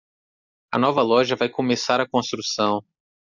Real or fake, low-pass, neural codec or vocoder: real; 7.2 kHz; none